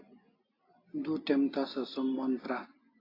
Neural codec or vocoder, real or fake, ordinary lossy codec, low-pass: none; real; AAC, 24 kbps; 5.4 kHz